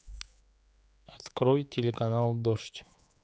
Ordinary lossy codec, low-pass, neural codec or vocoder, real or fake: none; none; codec, 16 kHz, 4 kbps, X-Codec, HuBERT features, trained on general audio; fake